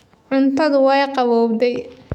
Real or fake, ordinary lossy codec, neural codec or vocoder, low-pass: fake; none; autoencoder, 48 kHz, 128 numbers a frame, DAC-VAE, trained on Japanese speech; 19.8 kHz